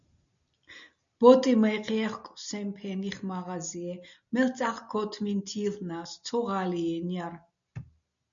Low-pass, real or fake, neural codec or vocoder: 7.2 kHz; real; none